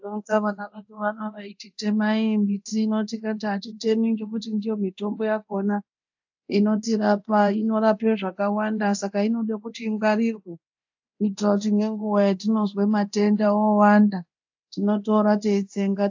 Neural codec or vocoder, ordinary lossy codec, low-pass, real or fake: codec, 24 kHz, 0.9 kbps, DualCodec; AAC, 48 kbps; 7.2 kHz; fake